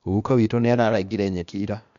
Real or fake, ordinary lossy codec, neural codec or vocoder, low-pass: fake; none; codec, 16 kHz, 0.8 kbps, ZipCodec; 7.2 kHz